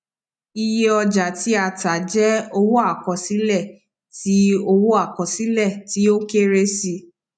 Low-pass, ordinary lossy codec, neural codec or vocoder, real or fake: 9.9 kHz; none; none; real